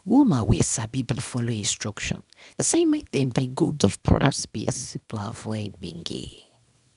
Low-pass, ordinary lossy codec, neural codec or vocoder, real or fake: 10.8 kHz; none; codec, 24 kHz, 0.9 kbps, WavTokenizer, small release; fake